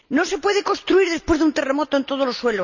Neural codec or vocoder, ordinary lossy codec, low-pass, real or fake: none; none; 7.2 kHz; real